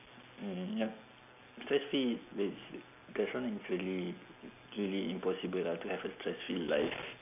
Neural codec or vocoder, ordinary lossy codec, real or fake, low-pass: none; none; real; 3.6 kHz